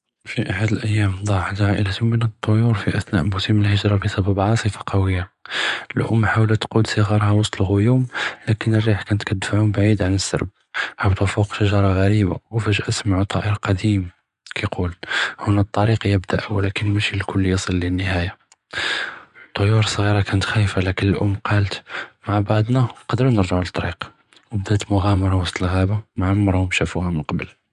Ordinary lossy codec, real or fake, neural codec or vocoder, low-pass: none; fake; vocoder, 24 kHz, 100 mel bands, Vocos; 10.8 kHz